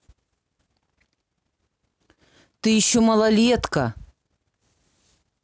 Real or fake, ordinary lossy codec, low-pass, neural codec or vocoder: real; none; none; none